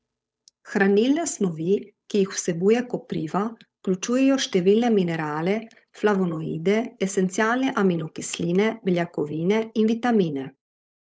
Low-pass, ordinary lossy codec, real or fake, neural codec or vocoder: none; none; fake; codec, 16 kHz, 8 kbps, FunCodec, trained on Chinese and English, 25 frames a second